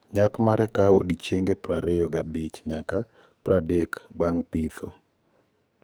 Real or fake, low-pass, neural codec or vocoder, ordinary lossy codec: fake; none; codec, 44.1 kHz, 2.6 kbps, SNAC; none